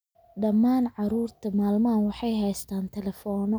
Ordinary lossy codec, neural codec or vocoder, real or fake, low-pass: none; none; real; none